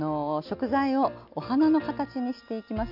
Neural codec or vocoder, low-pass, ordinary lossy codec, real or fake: none; 5.4 kHz; none; real